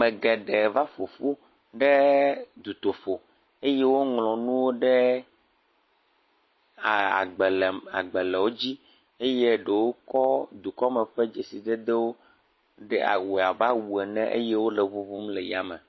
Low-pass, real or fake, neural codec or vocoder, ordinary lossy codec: 7.2 kHz; real; none; MP3, 24 kbps